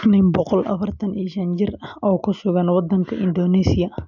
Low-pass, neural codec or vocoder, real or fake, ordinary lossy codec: 7.2 kHz; none; real; none